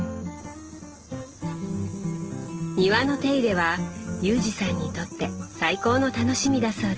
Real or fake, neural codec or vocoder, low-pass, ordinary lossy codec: real; none; 7.2 kHz; Opus, 16 kbps